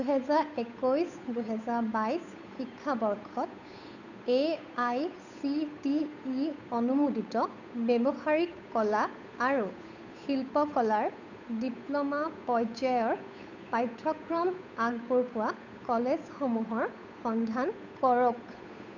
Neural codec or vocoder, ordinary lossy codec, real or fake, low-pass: codec, 16 kHz, 8 kbps, FunCodec, trained on Chinese and English, 25 frames a second; none; fake; 7.2 kHz